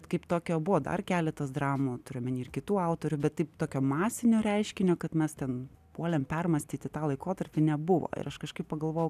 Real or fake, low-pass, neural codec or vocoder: real; 14.4 kHz; none